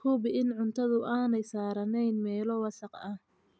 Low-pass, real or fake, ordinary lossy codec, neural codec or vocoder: none; real; none; none